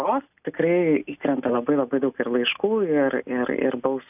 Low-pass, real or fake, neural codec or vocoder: 3.6 kHz; real; none